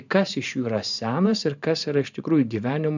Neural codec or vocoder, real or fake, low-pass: vocoder, 44.1 kHz, 128 mel bands every 512 samples, BigVGAN v2; fake; 7.2 kHz